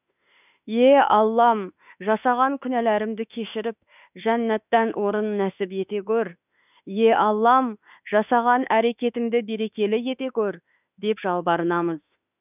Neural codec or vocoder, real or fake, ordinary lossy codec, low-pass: autoencoder, 48 kHz, 32 numbers a frame, DAC-VAE, trained on Japanese speech; fake; none; 3.6 kHz